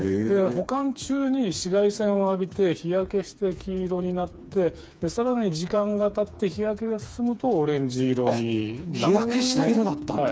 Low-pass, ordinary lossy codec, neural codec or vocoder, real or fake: none; none; codec, 16 kHz, 4 kbps, FreqCodec, smaller model; fake